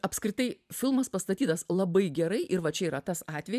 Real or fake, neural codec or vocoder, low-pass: fake; vocoder, 44.1 kHz, 128 mel bands every 512 samples, BigVGAN v2; 14.4 kHz